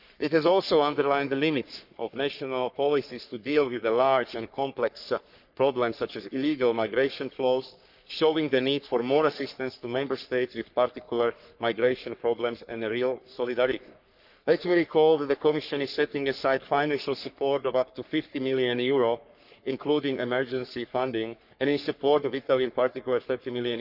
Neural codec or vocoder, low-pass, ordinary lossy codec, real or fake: codec, 44.1 kHz, 3.4 kbps, Pupu-Codec; 5.4 kHz; none; fake